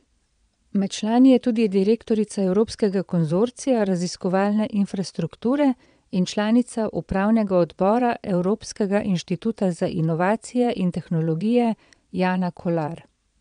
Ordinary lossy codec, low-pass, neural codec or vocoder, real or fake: none; 9.9 kHz; vocoder, 22.05 kHz, 80 mel bands, Vocos; fake